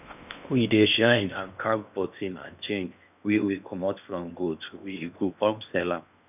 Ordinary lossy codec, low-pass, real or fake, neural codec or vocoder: none; 3.6 kHz; fake; codec, 16 kHz in and 24 kHz out, 0.8 kbps, FocalCodec, streaming, 65536 codes